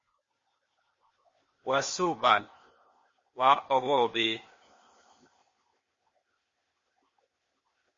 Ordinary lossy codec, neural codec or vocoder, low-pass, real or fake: MP3, 32 kbps; codec, 16 kHz, 0.8 kbps, ZipCodec; 7.2 kHz; fake